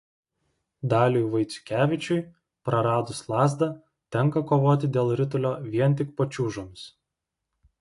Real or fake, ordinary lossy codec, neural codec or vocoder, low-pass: real; AAC, 48 kbps; none; 10.8 kHz